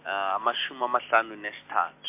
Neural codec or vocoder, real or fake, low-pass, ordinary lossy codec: none; real; 3.6 kHz; MP3, 24 kbps